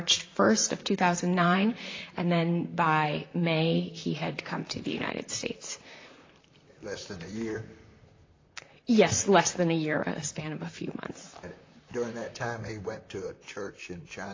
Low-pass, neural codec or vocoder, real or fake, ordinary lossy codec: 7.2 kHz; vocoder, 44.1 kHz, 128 mel bands, Pupu-Vocoder; fake; AAC, 32 kbps